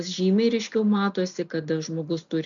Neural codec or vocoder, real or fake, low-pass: none; real; 7.2 kHz